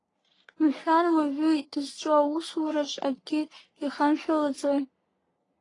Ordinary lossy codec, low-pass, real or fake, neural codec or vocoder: AAC, 32 kbps; 10.8 kHz; fake; codec, 44.1 kHz, 3.4 kbps, Pupu-Codec